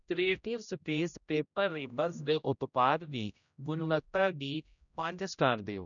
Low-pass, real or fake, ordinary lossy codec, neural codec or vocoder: 7.2 kHz; fake; none; codec, 16 kHz, 0.5 kbps, X-Codec, HuBERT features, trained on general audio